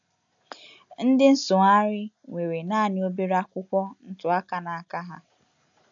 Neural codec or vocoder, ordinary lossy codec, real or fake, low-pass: none; AAC, 64 kbps; real; 7.2 kHz